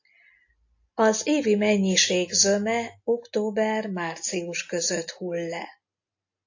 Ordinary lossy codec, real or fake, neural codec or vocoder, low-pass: AAC, 48 kbps; real; none; 7.2 kHz